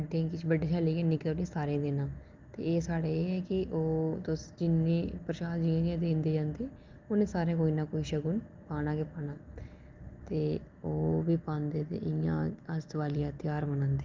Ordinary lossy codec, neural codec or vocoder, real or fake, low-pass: Opus, 24 kbps; none; real; 7.2 kHz